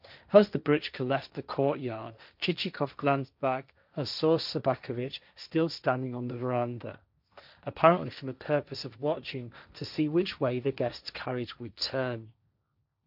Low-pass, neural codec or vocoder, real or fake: 5.4 kHz; codec, 16 kHz, 1.1 kbps, Voila-Tokenizer; fake